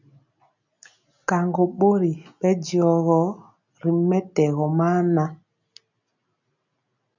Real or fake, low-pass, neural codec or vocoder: real; 7.2 kHz; none